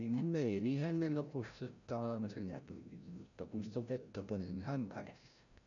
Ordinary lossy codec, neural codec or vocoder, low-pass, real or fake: none; codec, 16 kHz, 0.5 kbps, FreqCodec, larger model; 7.2 kHz; fake